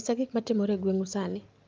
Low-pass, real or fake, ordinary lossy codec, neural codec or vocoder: 7.2 kHz; real; Opus, 24 kbps; none